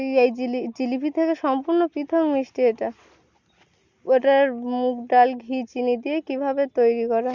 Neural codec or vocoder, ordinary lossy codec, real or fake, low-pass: none; Opus, 64 kbps; real; 7.2 kHz